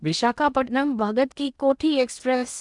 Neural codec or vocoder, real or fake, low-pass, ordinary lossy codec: codec, 16 kHz in and 24 kHz out, 0.8 kbps, FocalCodec, streaming, 65536 codes; fake; 10.8 kHz; none